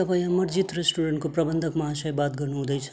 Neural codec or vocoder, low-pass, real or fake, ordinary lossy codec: none; none; real; none